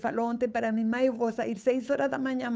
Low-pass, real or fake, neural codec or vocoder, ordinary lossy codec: none; fake; codec, 16 kHz, 8 kbps, FunCodec, trained on Chinese and English, 25 frames a second; none